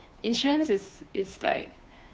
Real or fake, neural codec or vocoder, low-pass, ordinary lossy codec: fake; codec, 16 kHz, 2 kbps, FunCodec, trained on Chinese and English, 25 frames a second; none; none